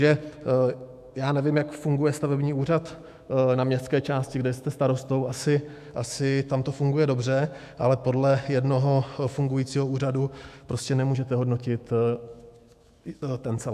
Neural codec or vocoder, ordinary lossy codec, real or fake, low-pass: autoencoder, 48 kHz, 128 numbers a frame, DAC-VAE, trained on Japanese speech; MP3, 96 kbps; fake; 14.4 kHz